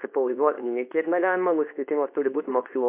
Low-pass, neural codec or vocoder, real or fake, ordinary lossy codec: 3.6 kHz; codec, 24 kHz, 0.9 kbps, WavTokenizer, medium speech release version 2; fake; MP3, 32 kbps